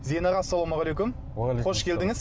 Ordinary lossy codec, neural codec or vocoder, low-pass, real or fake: none; none; none; real